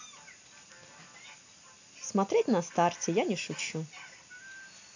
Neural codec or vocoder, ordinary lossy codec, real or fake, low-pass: none; AAC, 48 kbps; real; 7.2 kHz